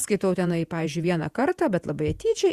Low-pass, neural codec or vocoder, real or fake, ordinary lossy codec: 14.4 kHz; vocoder, 48 kHz, 128 mel bands, Vocos; fake; Opus, 64 kbps